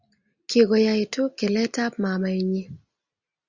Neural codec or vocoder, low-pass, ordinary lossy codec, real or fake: none; 7.2 kHz; Opus, 64 kbps; real